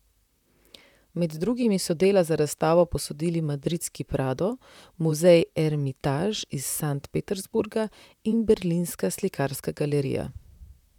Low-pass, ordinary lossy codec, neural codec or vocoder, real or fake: 19.8 kHz; none; vocoder, 44.1 kHz, 128 mel bands, Pupu-Vocoder; fake